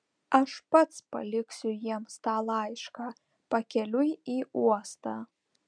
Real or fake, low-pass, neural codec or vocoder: real; 9.9 kHz; none